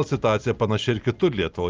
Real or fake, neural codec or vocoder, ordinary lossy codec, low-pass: real; none; Opus, 24 kbps; 7.2 kHz